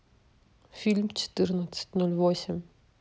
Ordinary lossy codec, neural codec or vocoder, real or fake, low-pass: none; none; real; none